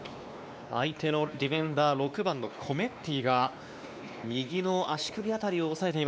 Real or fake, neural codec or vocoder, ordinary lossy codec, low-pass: fake; codec, 16 kHz, 2 kbps, X-Codec, WavLM features, trained on Multilingual LibriSpeech; none; none